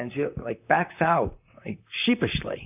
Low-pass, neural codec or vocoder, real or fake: 3.6 kHz; vocoder, 44.1 kHz, 128 mel bands, Pupu-Vocoder; fake